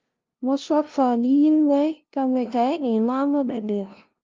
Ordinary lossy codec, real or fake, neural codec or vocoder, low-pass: Opus, 24 kbps; fake; codec, 16 kHz, 0.5 kbps, FunCodec, trained on LibriTTS, 25 frames a second; 7.2 kHz